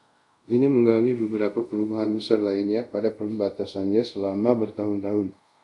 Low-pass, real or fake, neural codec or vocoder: 10.8 kHz; fake; codec, 24 kHz, 0.5 kbps, DualCodec